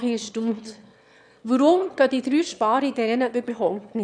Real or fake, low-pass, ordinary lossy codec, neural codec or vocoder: fake; none; none; autoencoder, 22.05 kHz, a latent of 192 numbers a frame, VITS, trained on one speaker